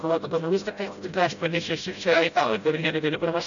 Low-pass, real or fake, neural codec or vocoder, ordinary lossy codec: 7.2 kHz; fake; codec, 16 kHz, 0.5 kbps, FreqCodec, smaller model; MP3, 96 kbps